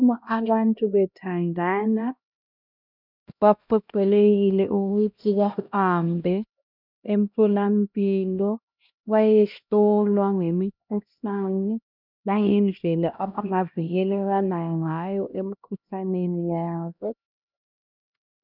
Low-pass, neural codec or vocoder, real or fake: 5.4 kHz; codec, 16 kHz, 1 kbps, X-Codec, HuBERT features, trained on LibriSpeech; fake